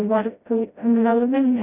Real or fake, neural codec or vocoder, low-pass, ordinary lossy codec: fake; codec, 16 kHz, 0.5 kbps, FreqCodec, smaller model; 3.6 kHz; none